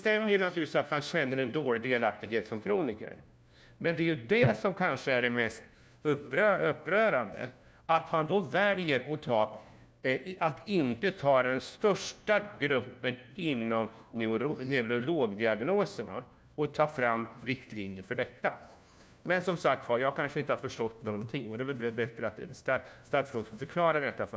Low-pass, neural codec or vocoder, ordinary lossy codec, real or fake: none; codec, 16 kHz, 1 kbps, FunCodec, trained on LibriTTS, 50 frames a second; none; fake